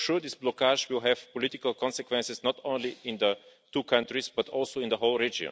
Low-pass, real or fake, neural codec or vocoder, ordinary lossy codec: none; real; none; none